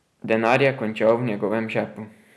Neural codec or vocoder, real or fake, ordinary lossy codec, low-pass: none; real; none; none